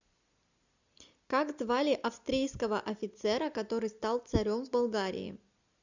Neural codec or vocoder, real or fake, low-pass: none; real; 7.2 kHz